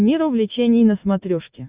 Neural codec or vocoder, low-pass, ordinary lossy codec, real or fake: none; 3.6 kHz; Opus, 64 kbps; real